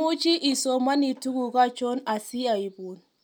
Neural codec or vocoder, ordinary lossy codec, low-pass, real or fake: none; none; 19.8 kHz; real